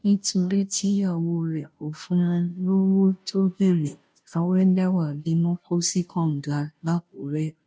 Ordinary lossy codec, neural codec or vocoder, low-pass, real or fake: none; codec, 16 kHz, 0.5 kbps, FunCodec, trained on Chinese and English, 25 frames a second; none; fake